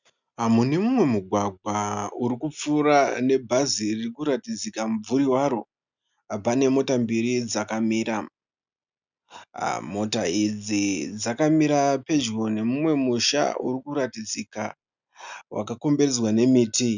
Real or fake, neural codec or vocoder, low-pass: real; none; 7.2 kHz